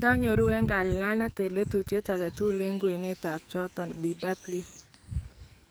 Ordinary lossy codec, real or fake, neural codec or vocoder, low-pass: none; fake; codec, 44.1 kHz, 2.6 kbps, SNAC; none